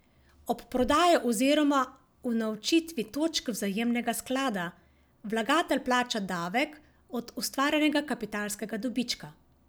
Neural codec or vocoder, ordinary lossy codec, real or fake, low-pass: none; none; real; none